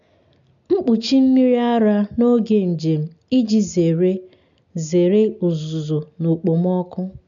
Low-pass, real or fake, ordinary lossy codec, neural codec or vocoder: 7.2 kHz; real; none; none